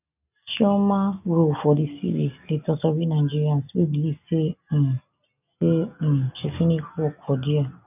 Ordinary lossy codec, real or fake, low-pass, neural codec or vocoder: none; real; 3.6 kHz; none